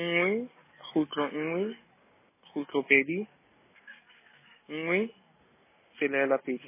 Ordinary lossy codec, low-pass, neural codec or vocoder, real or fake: MP3, 16 kbps; 3.6 kHz; none; real